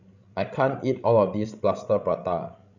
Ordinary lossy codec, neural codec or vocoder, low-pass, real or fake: none; codec, 16 kHz, 16 kbps, FreqCodec, larger model; 7.2 kHz; fake